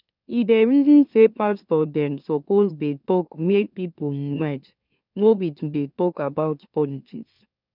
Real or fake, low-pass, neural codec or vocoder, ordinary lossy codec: fake; 5.4 kHz; autoencoder, 44.1 kHz, a latent of 192 numbers a frame, MeloTTS; none